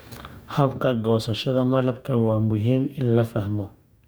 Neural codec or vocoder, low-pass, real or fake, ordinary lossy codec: codec, 44.1 kHz, 2.6 kbps, DAC; none; fake; none